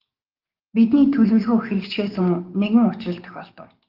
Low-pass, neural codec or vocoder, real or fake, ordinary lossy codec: 5.4 kHz; codec, 44.1 kHz, 7.8 kbps, DAC; fake; Opus, 32 kbps